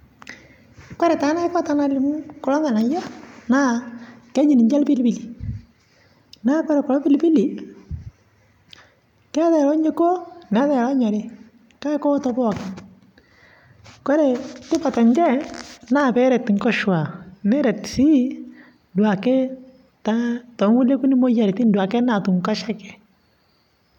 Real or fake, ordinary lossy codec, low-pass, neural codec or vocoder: real; none; 19.8 kHz; none